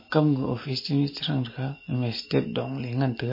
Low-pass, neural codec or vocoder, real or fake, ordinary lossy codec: 5.4 kHz; none; real; MP3, 24 kbps